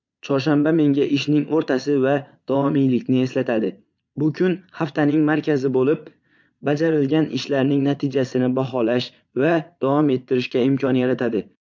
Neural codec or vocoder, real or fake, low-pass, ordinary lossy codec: vocoder, 22.05 kHz, 80 mel bands, Vocos; fake; 7.2 kHz; MP3, 64 kbps